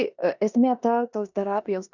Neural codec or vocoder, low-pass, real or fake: codec, 16 kHz in and 24 kHz out, 0.9 kbps, LongCat-Audio-Codec, four codebook decoder; 7.2 kHz; fake